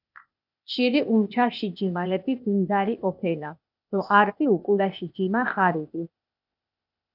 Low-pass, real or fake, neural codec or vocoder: 5.4 kHz; fake; codec, 16 kHz, 0.8 kbps, ZipCodec